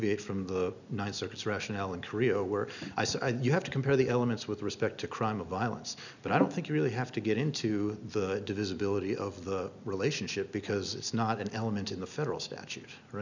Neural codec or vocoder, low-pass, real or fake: none; 7.2 kHz; real